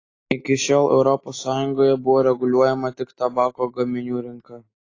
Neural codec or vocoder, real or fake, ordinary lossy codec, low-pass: none; real; AAC, 32 kbps; 7.2 kHz